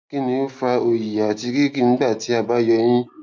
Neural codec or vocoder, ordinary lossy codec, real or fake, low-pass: none; none; real; none